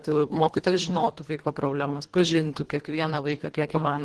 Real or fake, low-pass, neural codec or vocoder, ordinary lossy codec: fake; 10.8 kHz; codec, 24 kHz, 1.5 kbps, HILCodec; Opus, 16 kbps